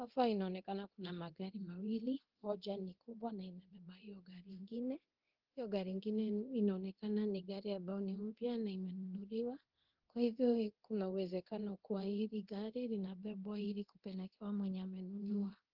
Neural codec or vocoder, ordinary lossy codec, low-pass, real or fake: codec, 24 kHz, 0.9 kbps, DualCodec; Opus, 16 kbps; 5.4 kHz; fake